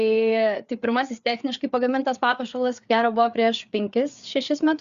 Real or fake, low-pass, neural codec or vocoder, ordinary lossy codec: fake; 7.2 kHz; codec, 16 kHz, 4 kbps, FreqCodec, larger model; Opus, 64 kbps